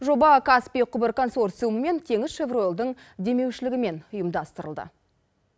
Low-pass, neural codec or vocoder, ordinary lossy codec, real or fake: none; none; none; real